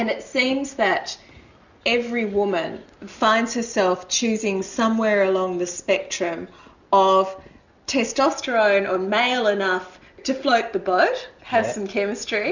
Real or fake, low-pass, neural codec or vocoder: real; 7.2 kHz; none